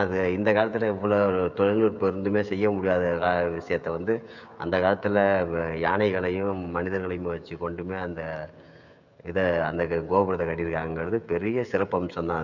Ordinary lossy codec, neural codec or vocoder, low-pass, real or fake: none; codec, 16 kHz, 16 kbps, FreqCodec, smaller model; 7.2 kHz; fake